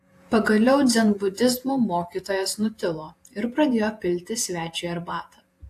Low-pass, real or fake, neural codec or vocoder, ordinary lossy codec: 14.4 kHz; fake; vocoder, 48 kHz, 128 mel bands, Vocos; AAC, 64 kbps